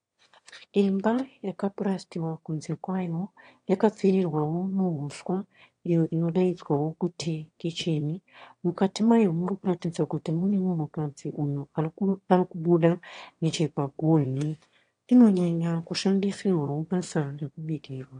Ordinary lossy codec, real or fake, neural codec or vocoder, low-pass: AAC, 48 kbps; fake; autoencoder, 22.05 kHz, a latent of 192 numbers a frame, VITS, trained on one speaker; 9.9 kHz